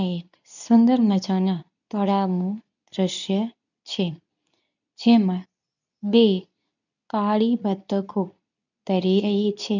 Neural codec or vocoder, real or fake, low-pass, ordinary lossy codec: codec, 24 kHz, 0.9 kbps, WavTokenizer, medium speech release version 2; fake; 7.2 kHz; none